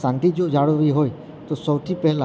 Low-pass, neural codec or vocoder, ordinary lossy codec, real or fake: none; none; none; real